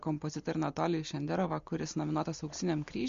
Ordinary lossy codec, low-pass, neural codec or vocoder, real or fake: MP3, 48 kbps; 7.2 kHz; none; real